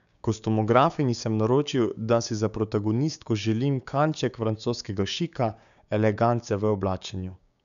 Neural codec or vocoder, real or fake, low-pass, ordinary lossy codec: codec, 16 kHz, 6 kbps, DAC; fake; 7.2 kHz; none